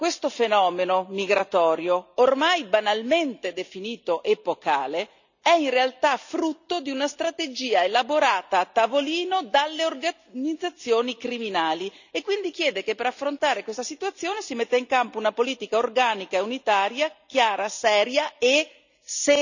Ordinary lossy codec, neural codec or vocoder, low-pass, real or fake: none; none; 7.2 kHz; real